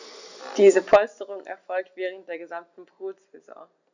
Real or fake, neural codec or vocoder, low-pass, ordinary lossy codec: real; none; 7.2 kHz; none